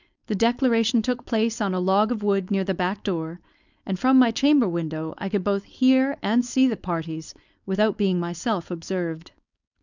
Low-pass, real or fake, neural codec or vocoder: 7.2 kHz; fake; codec, 16 kHz, 4.8 kbps, FACodec